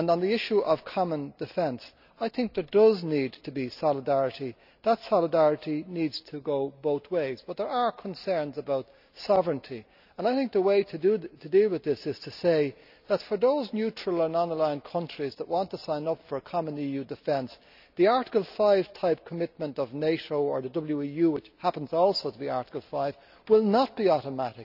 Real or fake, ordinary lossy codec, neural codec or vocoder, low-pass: real; none; none; 5.4 kHz